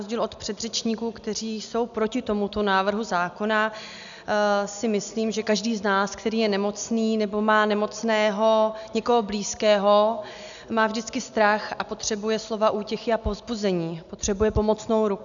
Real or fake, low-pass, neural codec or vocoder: real; 7.2 kHz; none